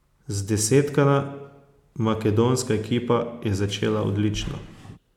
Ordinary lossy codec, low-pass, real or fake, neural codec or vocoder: none; 19.8 kHz; real; none